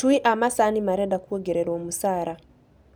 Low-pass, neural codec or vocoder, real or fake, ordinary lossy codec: none; none; real; none